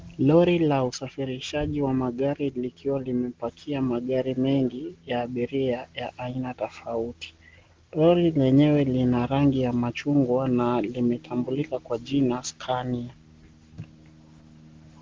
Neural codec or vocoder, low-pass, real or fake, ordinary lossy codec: none; 7.2 kHz; real; Opus, 16 kbps